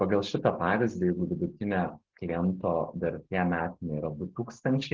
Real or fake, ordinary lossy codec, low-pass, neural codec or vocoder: real; Opus, 16 kbps; 7.2 kHz; none